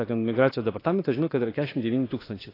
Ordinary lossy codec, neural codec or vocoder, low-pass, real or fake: AAC, 24 kbps; autoencoder, 48 kHz, 32 numbers a frame, DAC-VAE, trained on Japanese speech; 5.4 kHz; fake